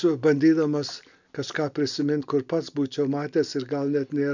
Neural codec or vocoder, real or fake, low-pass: none; real; 7.2 kHz